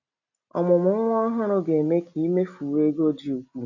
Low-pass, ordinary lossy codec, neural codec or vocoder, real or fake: 7.2 kHz; none; none; real